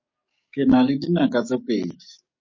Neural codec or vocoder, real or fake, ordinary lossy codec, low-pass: codec, 44.1 kHz, 7.8 kbps, DAC; fake; MP3, 32 kbps; 7.2 kHz